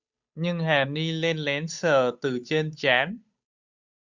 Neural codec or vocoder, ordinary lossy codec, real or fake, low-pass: codec, 16 kHz, 8 kbps, FunCodec, trained on Chinese and English, 25 frames a second; Opus, 64 kbps; fake; 7.2 kHz